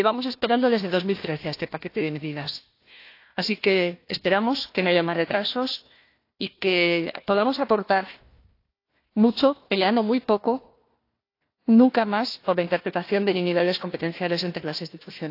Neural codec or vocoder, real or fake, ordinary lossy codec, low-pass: codec, 16 kHz, 1 kbps, FunCodec, trained on Chinese and English, 50 frames a second; fake; AAC, 32 kbps; 5.4 kHz